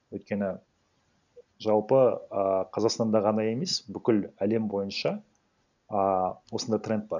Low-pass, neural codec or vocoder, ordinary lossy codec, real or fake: 7.2 kHz; none; none; real